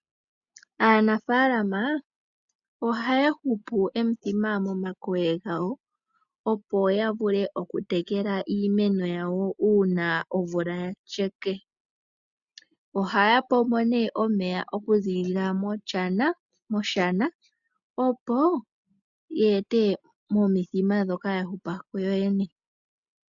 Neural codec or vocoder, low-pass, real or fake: none; 7.2 kHz; real